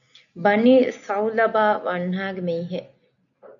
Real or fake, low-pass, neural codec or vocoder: real; 7.2 kHz; none